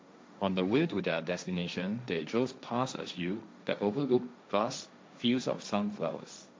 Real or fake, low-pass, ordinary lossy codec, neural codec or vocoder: fake; none; none; codec, 16 kHz, 1.1 kbps, Voila-Tokenizer